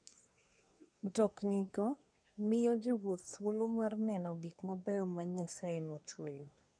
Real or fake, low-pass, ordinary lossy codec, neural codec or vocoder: fake; 9.9 kHz; none; codec, 24 kHz, 1 kbps, SNAC